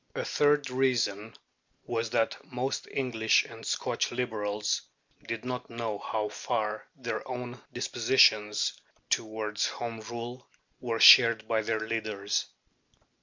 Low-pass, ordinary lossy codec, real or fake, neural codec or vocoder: 7.2 kHz; MP3, 64 kbps; real; none